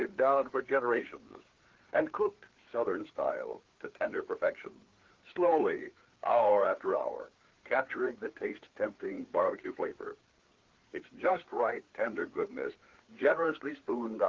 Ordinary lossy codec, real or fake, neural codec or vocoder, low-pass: Opus, 16 kbps; fake; codec, 16 kHz, 4 kbps, FreqCodec, larger model; 7.2 kHz